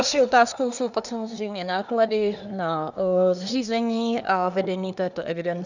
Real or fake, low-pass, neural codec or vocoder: fake; 7.2 kHz; codec, 24 kHz, 1 kbps, SNAC